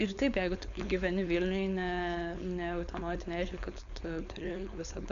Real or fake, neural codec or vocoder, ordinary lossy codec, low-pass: fake; codec, 16 kHz, 4.8 kbps, FACodec; MP3, 96 kbps; 7.2 kHz